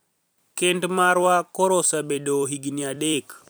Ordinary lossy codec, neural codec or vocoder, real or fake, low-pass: none; vocoder, 44.1 kHz, 128 mel bands every 256 samples, BigVGAN v2; fake; none